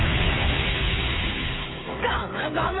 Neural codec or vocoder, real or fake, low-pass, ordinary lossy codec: codec, 16 kHz, 8 kbps, FreqCodec, smaller model; fake; 7.2 kHz; AAC, 16 kbps